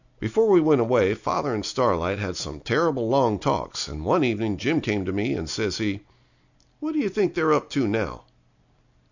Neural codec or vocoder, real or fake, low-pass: none; real; 7.2 kHz